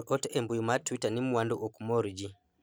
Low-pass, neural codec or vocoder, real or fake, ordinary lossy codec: none; none; real; none